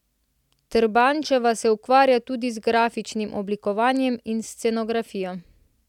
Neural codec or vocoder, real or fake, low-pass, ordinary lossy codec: none; real; 19.8 kHz; none